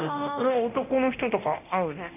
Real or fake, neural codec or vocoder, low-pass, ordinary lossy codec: fake; codec, 24 kHz, 0.9 kbps, DualCodec; 3.6 kHz; none